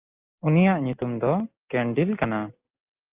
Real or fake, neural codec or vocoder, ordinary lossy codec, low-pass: real; none; Opus, 24 kbps; 3.6 kHz